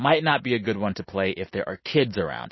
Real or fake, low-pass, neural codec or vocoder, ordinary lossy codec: real; 7.2 kHz; none; MP3, 24 kbps